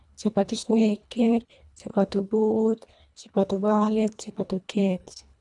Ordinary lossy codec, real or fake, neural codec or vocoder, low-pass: none; fake; codec, 24 kHz, 1.5 kbps, HILCodec; 10.8 kHz